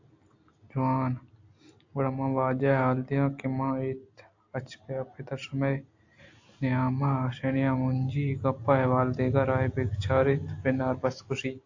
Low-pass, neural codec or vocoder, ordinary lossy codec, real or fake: 7.2 kHz; none; AAC, 48 kbps; real